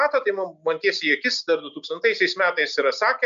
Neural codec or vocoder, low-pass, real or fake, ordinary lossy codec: none; 7.2 kHz; real; MP3, 64 kbps